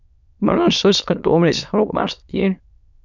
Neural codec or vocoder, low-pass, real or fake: autoencoder, 22.05 kHz, a latent of 192 numbers a frame, VITS, trained on many speakers; 7.2 kHz; fake